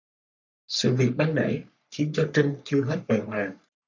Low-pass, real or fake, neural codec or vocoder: 7.2 kHz; fake; codec, 44.1 kHz, 3.4 kbps, Pupu-Codec